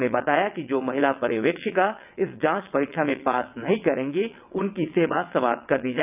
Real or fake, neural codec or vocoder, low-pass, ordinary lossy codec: fake; vocoder, 22.05 kHz, 80 mel bands, WaveNeXt; 3.6 kHz; none